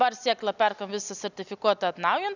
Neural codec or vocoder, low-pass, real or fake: none; 7.2 kHz; real